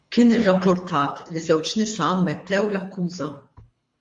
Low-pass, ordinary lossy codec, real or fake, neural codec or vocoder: 10.8 kHz; MP3, 48 kbps; fake; codec, 24 kHz, 3 kbps, HILCodec